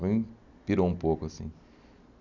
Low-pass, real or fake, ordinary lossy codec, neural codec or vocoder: 7.2 kHz; real; none; none